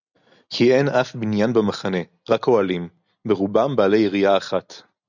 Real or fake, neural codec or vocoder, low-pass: real; none; 7.2 kHz